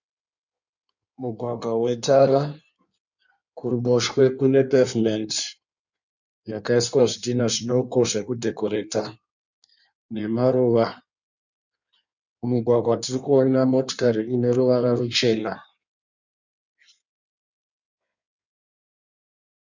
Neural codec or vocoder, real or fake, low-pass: codec, 16 kHz in and 24 kHz out, 1.1 kbps, FireRedTTS-2 codec; fake; 7.2 kHz